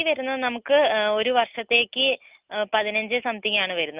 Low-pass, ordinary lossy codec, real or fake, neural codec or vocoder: 3.6 kHz; Opus, 24 kbps; real; none